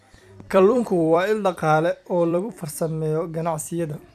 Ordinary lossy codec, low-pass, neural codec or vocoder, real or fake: none; 14.4 kHz; vocoder, 44.1 kHz, 128 mel bands every 256 samples, BigVGAN v2; fake